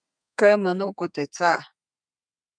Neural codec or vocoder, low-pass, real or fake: codec, 32 kHz, 1.9 kbps, SNAC; 9.9 kHz; fake